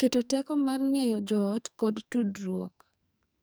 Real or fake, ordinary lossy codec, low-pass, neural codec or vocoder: fake; none; none; codec, 44.1 kHz, 2.6 kbps, SNAC